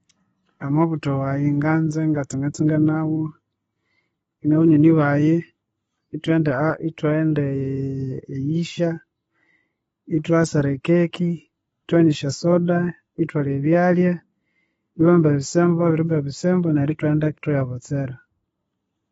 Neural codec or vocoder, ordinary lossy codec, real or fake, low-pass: none; AAC, 24 kbps; real; 10.8 kHz